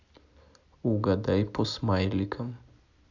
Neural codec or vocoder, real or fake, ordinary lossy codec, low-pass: none; real; none; 7.2 kHz